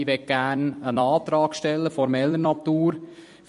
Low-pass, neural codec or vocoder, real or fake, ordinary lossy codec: 14.4 kHz; vocoder, 44.1 kHz, 128 mel bands every 256 samples, BigVGAN v2; fake; MP3, 48 kbps